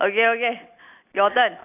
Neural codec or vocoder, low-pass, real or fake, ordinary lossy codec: none; 3.6 kHz; real; none